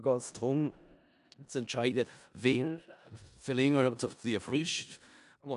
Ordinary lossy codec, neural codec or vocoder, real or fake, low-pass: none; codec, 16 kHz in and 24 kHz out, 0.4 kbps, LongCat-Audio-Codec, four codebook decoder; fake; 10.8 kHz